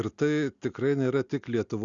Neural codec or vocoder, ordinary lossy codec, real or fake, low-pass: none; Opus, 64 kbps; real; 7.2 kHz